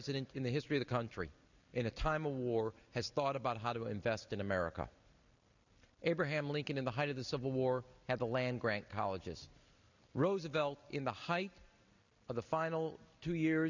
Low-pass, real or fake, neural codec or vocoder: 7.2 kHz; real; none